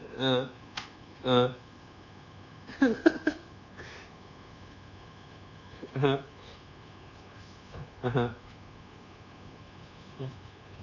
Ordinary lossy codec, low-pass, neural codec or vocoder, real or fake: none; 7.2 kHz; codec, 24 kHz, 1.2 kbps, DualCodec; fake